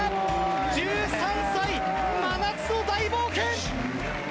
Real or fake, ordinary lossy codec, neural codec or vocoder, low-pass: real; none; none; none